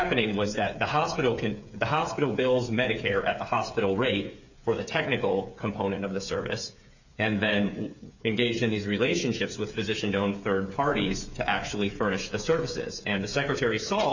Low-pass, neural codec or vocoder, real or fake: 7.2 kHz; codec, 16 kHz, 8 kbps, FreqCodec, smaller model; fake